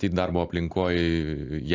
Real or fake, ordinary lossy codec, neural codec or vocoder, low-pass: real; AAC, 48 kbps; none; 7.2 kHz